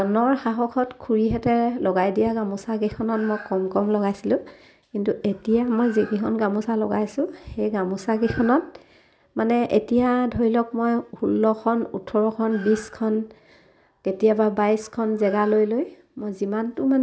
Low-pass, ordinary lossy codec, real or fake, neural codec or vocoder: none; none; real; none